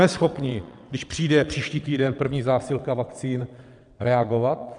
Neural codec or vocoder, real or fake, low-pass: vocoder, 22.05 kHz, 80 mel bands, WaveNeXt; fake; 9.9 kHz